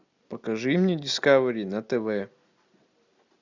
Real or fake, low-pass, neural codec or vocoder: real; 7.2 kHz; none